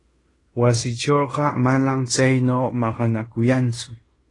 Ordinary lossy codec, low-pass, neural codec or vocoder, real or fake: AAC, 32 kbps; 10.8 kHz; codec, 16 kHz in and 24 kHz out, 0.9 kbps, LongCat-Audio-Codec, fine tuned four codebook decoder; fake